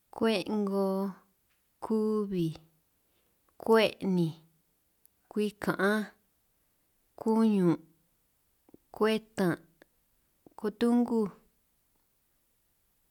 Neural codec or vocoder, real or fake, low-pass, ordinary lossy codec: none; real; 19.8 kHz; none